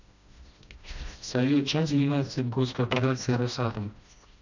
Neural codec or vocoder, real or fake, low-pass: codec, 16 kHz, 1 kbps, FreqCodec, smaller model; fake; 7.2 kHz